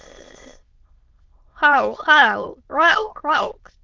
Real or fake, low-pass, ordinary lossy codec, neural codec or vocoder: fake; 7.2 kHz; Opus, 24 kbps; autoencoder, 22.05 kHz, a latent of 192 numbers a frame, VITS, trained on many speakers